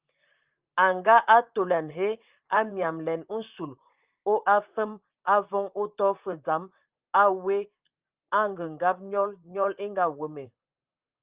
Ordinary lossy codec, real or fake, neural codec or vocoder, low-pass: Opus, 24 kbps; fake; vocoder, 44.1 kHz, 128 mel bands, Pupu-Vocoder; 3.6 kHz